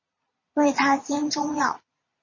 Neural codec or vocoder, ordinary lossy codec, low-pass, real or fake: vocoder, 22.05 kHz, 80 mel bands, Vocos; MP3, 32 kbps; 7.2 kHz; fake